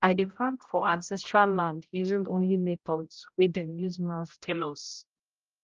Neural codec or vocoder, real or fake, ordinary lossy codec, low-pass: codec, 16 kHz, 0.5 kbps, X-Codec, HuBERT features, trained on general audio; fake; Opus, 32 kbps; 7.2 kHz